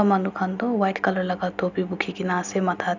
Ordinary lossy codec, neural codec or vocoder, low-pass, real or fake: none; none; 7.2 kHz; real